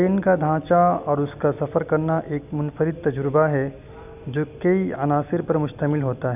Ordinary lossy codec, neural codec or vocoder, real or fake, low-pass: none; none; real; 3.6 kHz